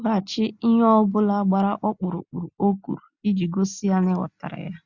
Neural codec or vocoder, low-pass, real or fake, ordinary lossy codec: none; 7.2 kHz; real; Opus, 64 kbps